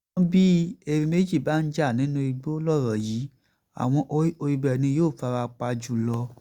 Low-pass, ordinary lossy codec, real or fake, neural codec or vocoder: 19.8 kHz; Opus, 64 kbps; real; none